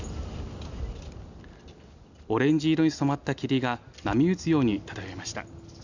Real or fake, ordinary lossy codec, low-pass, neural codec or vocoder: real; none; 7.2 kHz; none